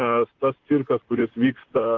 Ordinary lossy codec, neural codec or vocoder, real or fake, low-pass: Opus, 16 kbps; vocoder, 44.1 kHz, 128 mel bands, Pupu-Vocoder; fake; 7.2 kHz